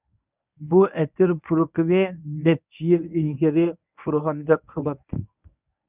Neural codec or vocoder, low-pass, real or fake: codec, 24 kHz, 0.9 kbps, WavTokenizer, medium speech release version 1; 3.6 kHz; fake